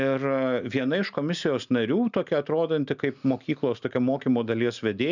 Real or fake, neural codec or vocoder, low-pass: real; none; 7.2 kHz